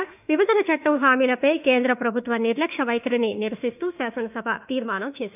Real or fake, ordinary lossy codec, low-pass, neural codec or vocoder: fake; none; 3.6 kHz; autoencoder, 48 kHz, 32 numbers a frame, DAC-VAE, trained on Japanese speech